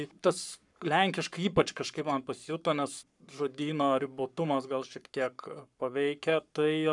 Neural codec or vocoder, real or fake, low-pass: codec, 44.1 kHz, 7.8 kbps, Pupu-Codec; fake; 10.8 kHz